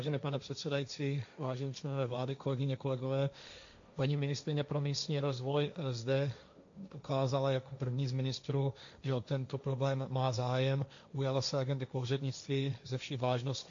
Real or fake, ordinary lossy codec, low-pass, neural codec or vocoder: fake; AAC, 64 kbps; 7.2 kHz; codec, 16 kHz, 1.1 kbps, Voila-Tokenizer